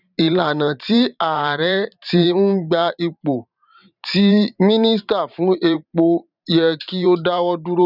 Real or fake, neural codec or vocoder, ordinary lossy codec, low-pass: fake; vocoder, 44.1 kHz, 128 mel bands every 512 samples, BigVGAN v2; none; 5.4 kHz